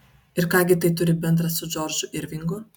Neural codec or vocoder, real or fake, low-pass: none; real; 19.8 kHz